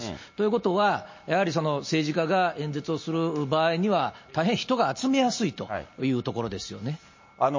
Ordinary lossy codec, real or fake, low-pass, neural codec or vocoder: MP3, 32 kbps; real; 7.2 kHz; none